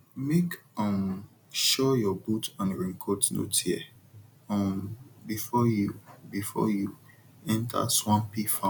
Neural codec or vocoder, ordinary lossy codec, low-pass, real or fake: none; none; 19.8 kHz; real